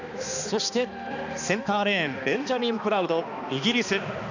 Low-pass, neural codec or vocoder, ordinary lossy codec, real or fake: 7.2 kHz; codec, 16 kHz, 2 kbps, X-Codec, HuBERT features, trained on balanced general audio; none; fake